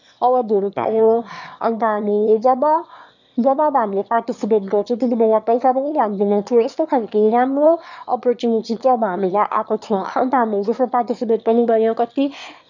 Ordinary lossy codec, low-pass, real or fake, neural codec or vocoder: none; 7.2 kHz; fake; autoencoder, 22.05 kHz, a latent of 192 numbers a frame, VITS, trained on one speaker